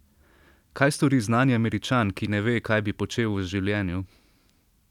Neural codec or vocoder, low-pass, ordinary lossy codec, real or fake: none; 19.8 kHz; none; real